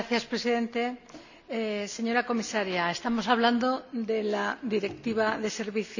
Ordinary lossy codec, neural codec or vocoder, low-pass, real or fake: none; none; 7.2 kHz; real